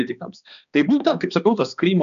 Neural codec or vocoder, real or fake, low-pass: codec, 16 kHz, 2 kbps, X-Codec, HuBERT features, trained on balanced general audio; fake; 7.2 kHz